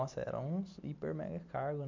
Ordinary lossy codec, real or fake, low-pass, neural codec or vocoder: MP3, 64 kbps; real; 7.2 kHz; none